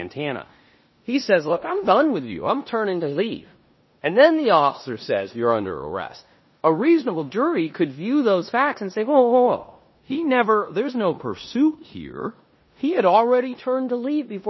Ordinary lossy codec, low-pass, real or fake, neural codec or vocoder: MP3, 24 kbps; 7.2 kHz; fake; codec, 16 kHz in and 24 kHz out, 0.9 kbps, LongCat-Audio-Codec, four codebook decoder